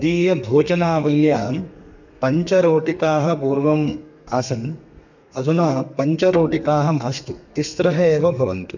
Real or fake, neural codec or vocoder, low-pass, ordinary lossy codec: fake; codec, 32 kHz, 1.9 kbps, SNAC; 7.2 kHz; none